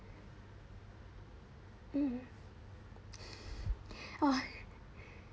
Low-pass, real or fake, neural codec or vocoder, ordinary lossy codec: none; real; none; none